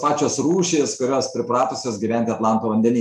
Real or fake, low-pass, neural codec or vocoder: real; 14.4 kHz; none